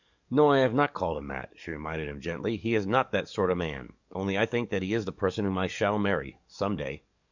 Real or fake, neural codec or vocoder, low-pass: fake; codec, 44.1 kHz, 7.8 kbps, DAC; 7.2 kHz